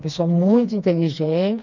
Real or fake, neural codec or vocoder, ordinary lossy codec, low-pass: fake; codec, 16 kHz, 2 kbps, FreqCodec, smaller model; none; 7.2 kHz